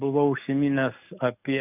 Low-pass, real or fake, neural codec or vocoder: 3.6 kHz; fake; codec, 44.1 kHz, 7.8 kbps, DAC